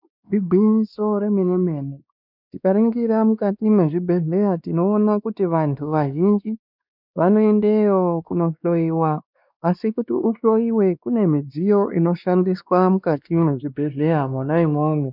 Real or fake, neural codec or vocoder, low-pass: fake; codec, 16 kHz, 2 kbps, X-Codec, WavLM features, trained on Multilingual LibriSpeech; 5.4 kHz